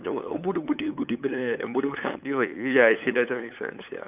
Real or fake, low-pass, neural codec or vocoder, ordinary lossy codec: fake; 3.6 kHz; codec, 16 kHz, 8 kbps, FunCodec, trained on LibriTTS, 25 frames a second; none